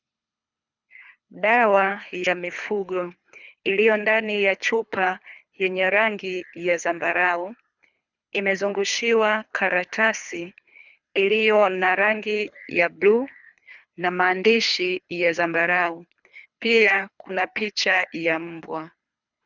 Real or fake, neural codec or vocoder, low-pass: fake; codec, 24 kHz, 3 kbps, HILCodec; 7.2 kHz